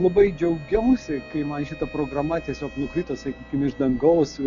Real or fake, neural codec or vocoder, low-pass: real; none; 7.2 kHz